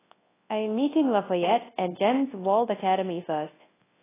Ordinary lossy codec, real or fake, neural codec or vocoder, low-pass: AAC, 16 kbps; fake; codec, 24 kHz, 0.9 kbps, WavTokenizer, large speech release; 3.6 kHz